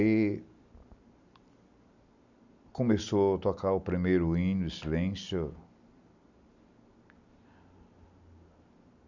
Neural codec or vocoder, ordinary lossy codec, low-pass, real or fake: none; none; 7.2 kHz; real